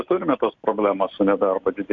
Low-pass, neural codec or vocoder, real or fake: 7.2 kHz; none; real